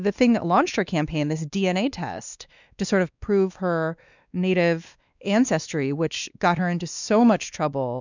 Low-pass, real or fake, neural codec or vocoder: 7.2 kHz; fake; codec, 16 kHz, 2 kbps, X-Codec, WavLM features, trained on Multilingual LibriSpeech